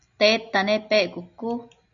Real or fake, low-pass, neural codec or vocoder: real; 7.2 kHz; none